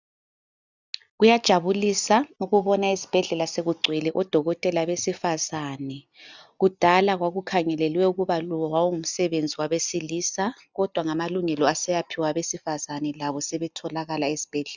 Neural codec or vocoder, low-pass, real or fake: none; 7.2 kHz; real